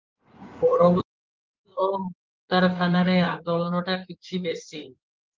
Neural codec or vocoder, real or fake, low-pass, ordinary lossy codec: codec, 16 kHz in and 24 kHz out, 2.2 kbps, FireRedTTS-2 codec; fake; 7.2 kHz; Opus, 24 kbps